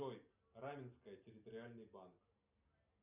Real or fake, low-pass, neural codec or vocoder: real; 3.6 kHz; none